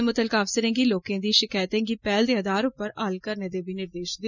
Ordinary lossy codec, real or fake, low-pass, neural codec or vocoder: none; real; 7.2 kHz; none